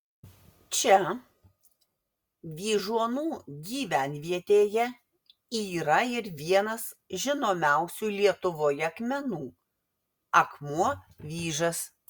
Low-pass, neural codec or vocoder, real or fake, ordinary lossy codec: 19.8 kHz; none; real; Opus, 64 kbps